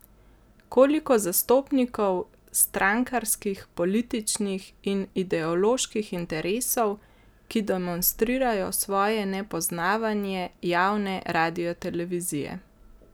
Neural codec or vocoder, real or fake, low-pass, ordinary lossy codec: none; real; none; none